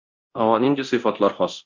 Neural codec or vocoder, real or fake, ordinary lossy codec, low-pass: codec, 24 kHz, 0.9 kbps, DualCodec; fake; MP3, 48 kbps; 7.2 kHz